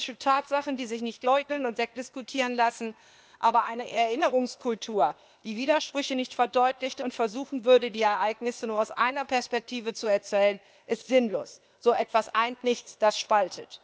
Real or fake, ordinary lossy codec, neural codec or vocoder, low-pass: fake; none; codec, 16 kHz, 0.8 kbps, ZipCodec; none